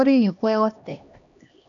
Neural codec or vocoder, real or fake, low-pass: codec, 16 kHz, 1 kbps, X-Codec, HuBERT features, trained on LibriSpeech; fake; 7.2 kHz